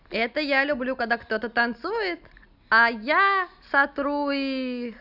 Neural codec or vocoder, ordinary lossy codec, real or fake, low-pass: none; none; real; 5.4 kHz